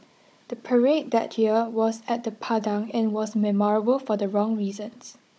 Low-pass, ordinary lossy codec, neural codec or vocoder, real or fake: none; none; codec, 16 kHz, 16 kbps, FunCodec, trained on Chinese and English, 50 frames a second; fake